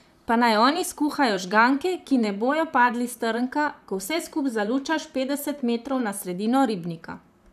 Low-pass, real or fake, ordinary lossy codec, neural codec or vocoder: 14.4 kHz; fake; none; vocoder, 44.1 kHz, 128 mel bands, Pupu-Vocoder